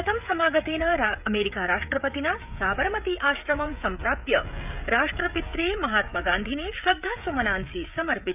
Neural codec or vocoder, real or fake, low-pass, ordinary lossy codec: codec, 16 kHz, 16 kbps, FreqCodec, smaller model; fake; 3.6 kHz; none